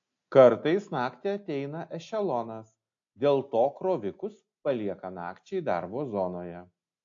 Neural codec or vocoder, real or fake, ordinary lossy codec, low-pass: none; real; AAC, 48 kbps; 7.2 kHz